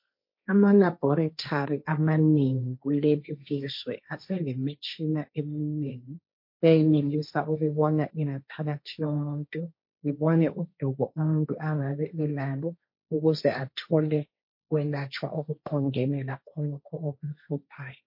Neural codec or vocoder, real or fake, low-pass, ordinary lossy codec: codec, 16 kHz, 1.1 kbps, Voila-Tokenizer; fake; 5.4 kHz; MP3, 32 kbps